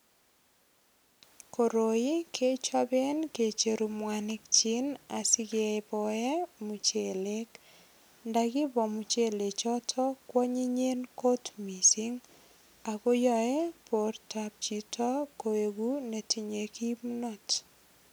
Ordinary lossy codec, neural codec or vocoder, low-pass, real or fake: none; none; none; real